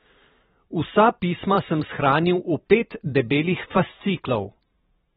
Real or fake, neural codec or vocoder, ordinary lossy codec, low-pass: fake; vocoder, 44.1 kHz, 128 mel bands every 512 samples, BigVGAN v2; AAC, 16 kbps; 19.8 kHz